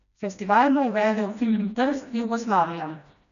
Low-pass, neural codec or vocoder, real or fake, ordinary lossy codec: 7.2 kHz; codec, 16 kHz, 1 kbps, FreqCodec, smaller model; fake; none